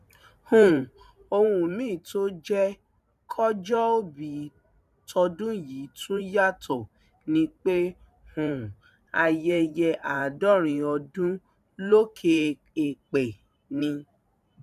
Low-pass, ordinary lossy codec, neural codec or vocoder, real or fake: 14.4 kHz; none; vocoder, 44.1 kHz, 128 mel bands every 512 samples, BigVGAN v2; fake